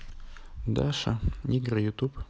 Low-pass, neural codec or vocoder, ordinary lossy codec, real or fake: none; none; none; real